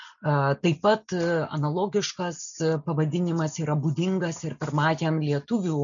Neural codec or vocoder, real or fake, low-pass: none; real; 7.2 kHz